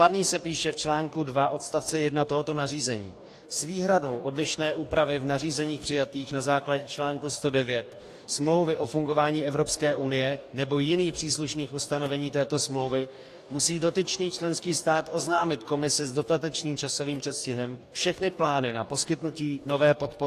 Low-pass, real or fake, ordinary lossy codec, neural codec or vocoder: 14.4 kHz; fake; AAC, 64 kbps; codec, 44.1 kHz, 2.6 kbps, DAC